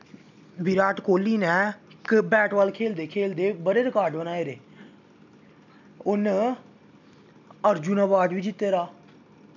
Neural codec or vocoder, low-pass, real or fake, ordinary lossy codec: none; 7.2 kHz; real; none